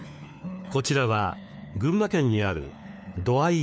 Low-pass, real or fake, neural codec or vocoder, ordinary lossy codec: none; fake; codec, 16 kHz, 4 kbps, FunCodec, trained on LibriTTS, 50 frames a second; none